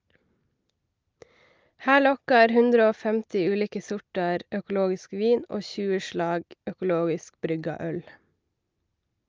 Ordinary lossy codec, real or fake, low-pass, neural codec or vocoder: Opus, 24 kbps; real; 7.2 kHz; none